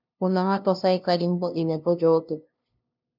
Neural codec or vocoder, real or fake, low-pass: codec, 16 kHz, 0.5 kbps, FunCodec, trained on LibriTTS, 25 frames a second; fake; 5.4 kHz